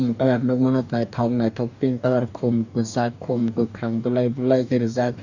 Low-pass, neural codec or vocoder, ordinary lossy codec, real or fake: 7.2 kHz; codec, 44.1 kHz, 2.6 kbps, DAC; none; fake